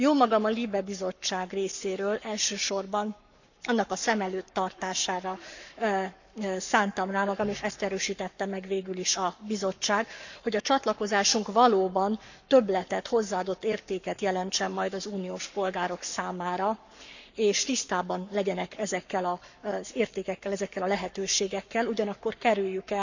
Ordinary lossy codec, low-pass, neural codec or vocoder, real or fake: none; 7.2 kHz; codec, 44.1 kHz, 7.8 kbps, Pupu-Codec; fake